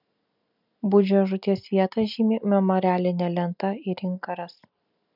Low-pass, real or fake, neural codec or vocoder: 5.4 kHz; real; none